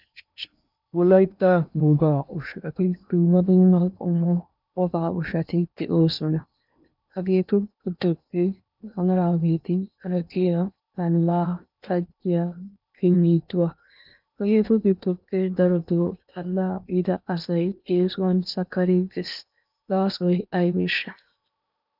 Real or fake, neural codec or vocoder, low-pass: fake; codec, 16 kHz in and 24 kHz out, 0.8 kbps, FocalCodec, streaming, 65536 codes; 5.4 kHz